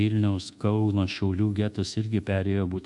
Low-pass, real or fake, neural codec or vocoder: 10.8 kHz; fake; codec, 24 kHz, 1.2 kbps, DualCodec